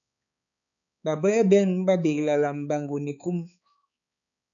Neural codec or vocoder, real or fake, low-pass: codec, 16 kHz, 4 kbps, X-Codec, HuBERT features, trained on balanced general audio; fake; 7.2 kHz